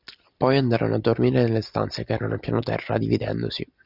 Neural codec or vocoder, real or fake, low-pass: none; real; 5.4 kHz